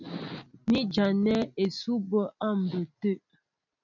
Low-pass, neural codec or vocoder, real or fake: 7.2 kHz; none; real